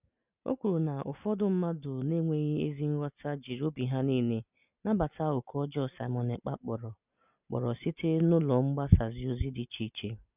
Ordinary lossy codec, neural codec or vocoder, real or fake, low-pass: none; none; real; 3.6 kHz